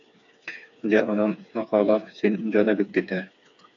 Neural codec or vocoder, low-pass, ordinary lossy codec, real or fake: codec, 16 kHz, 4 kbps, FreqCodec, smaller model; 7.2 kHz; AAC, 64 kbps; fake